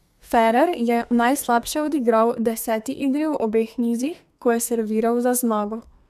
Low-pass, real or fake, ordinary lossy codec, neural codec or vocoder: 14.4 kHz; fake; none; codec, 32 kHz, 1.9 kbps, SNAC